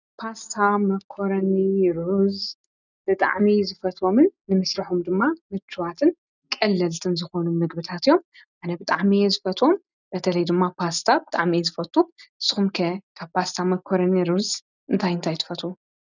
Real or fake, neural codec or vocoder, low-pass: real; none; 7.2 kHz